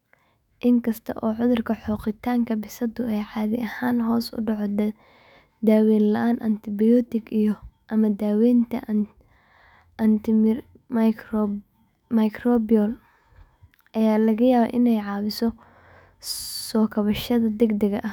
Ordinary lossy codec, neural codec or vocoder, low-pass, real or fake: none; autoencoder, 48 kHz, 128 numbers a frame, DAC-VAE, trained on Japanese speech; 19.8 kHz; fake